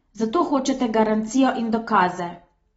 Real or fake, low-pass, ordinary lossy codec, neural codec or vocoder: real; 19.8 kHz; AAC, 24 kbps; none